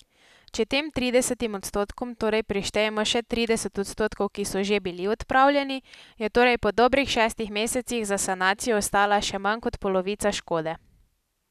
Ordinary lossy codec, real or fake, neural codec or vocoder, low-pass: none; real; none; 14.4 kHz